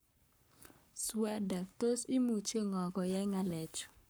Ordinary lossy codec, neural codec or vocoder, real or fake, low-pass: none; codec, 44.1 kHz, 7.8 kbps, Pupu-Codec; fake; none